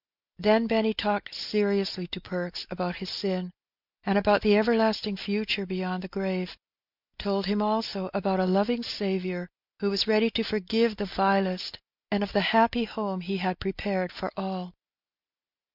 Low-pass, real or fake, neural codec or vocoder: 5.4 kHz; real; none